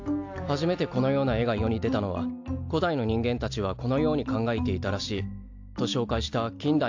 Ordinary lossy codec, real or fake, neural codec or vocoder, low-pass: AAC, 48 kbps; real; none; 7.2 kHz